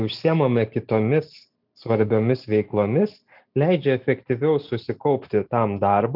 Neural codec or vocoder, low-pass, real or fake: none; 5.4 kHz; real